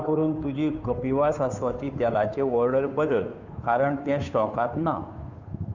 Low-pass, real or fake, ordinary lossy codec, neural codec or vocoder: 7.2 kHz; fake; none; codec, 16 kHz, 2 kbps, FunCodec, trained on Chinese and English, 25 frames a second